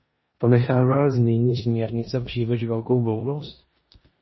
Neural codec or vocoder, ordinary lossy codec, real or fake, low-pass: codec, 16 kHz in and 24 kHz out, 0.9 kbps, LongCat-Audio-Codec, four codebook decoder; MP3, 24 kbps; fake; 7.2 kHz